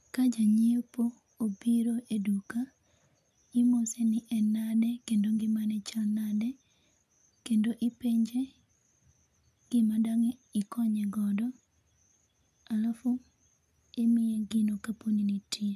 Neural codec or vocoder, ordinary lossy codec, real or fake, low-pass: none; none; real; 14.4 kHz